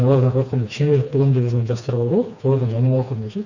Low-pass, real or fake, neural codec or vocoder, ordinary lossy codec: 7.2 kHz; fake; codec, 16 kHz, 2 kbps, FreqCodec, smaller model; none